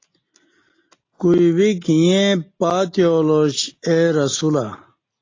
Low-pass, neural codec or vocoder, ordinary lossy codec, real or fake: 7.2 kHz; none; AAC, 32 kbps; real